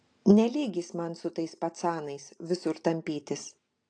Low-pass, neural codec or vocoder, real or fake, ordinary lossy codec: 9.9 kHz; none; real; AAC, 48 kbps